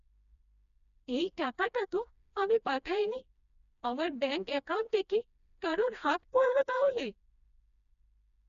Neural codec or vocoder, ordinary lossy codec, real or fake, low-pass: codec, 16 kHz, 1 kbps, FreqCodec, smaller model; none; fake; 7.2 kHz